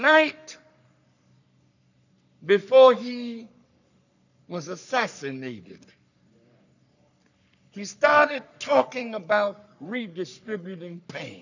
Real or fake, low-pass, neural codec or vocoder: fake; 7.2 kHz; codec, 44.1 kHz, 3.4 kbps, Pupu-Codec